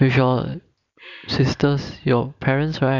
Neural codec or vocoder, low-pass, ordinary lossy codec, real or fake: vocoder, 44.1 kHz, 128 mel bands every 256 samples, BigVGAN v2; 7.2 kHz; none; fake